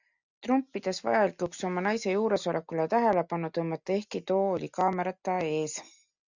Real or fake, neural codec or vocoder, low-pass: real; none; 7.2 kHz